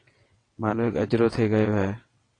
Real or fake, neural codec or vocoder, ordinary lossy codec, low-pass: fake; vocoder, 22.05 kHz, 80 mel bands, WaveNeXt; AAC, 32 kbps; 9.9 kHz